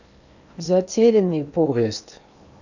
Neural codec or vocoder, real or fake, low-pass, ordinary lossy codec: codec, 16 kHz in and 24 kHz out, 0.8 kbps, FocalCodec, streaming, 65536 codes; fake; 7.2 kHz; none